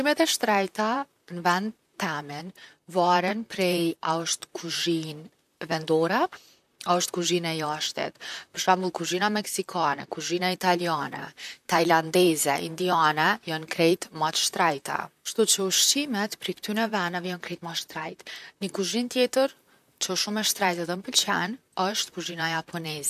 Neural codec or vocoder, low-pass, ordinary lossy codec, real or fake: vocoder, 44.1 kHz, 128 mel bands, Pupu-Vocoder; 14.4 kHz; none; fake